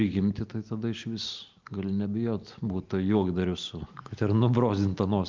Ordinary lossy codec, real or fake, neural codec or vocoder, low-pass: Opus, 32 kbps; real; none; 7.2 kHz